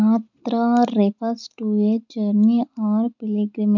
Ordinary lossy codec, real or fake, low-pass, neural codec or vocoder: none; real; 7.2 kHz; none